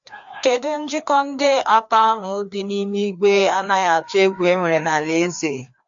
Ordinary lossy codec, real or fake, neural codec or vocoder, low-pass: MP3, 48 kbps; fake; codec, 16 kHz, 2 kbps, FreqCodec, larger model; 7.2 kHz